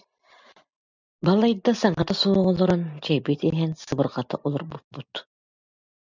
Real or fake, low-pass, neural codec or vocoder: real; 7.2 kHz; none